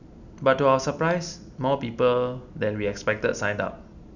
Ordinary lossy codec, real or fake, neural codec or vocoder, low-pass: none; real; none; 7.2 kHz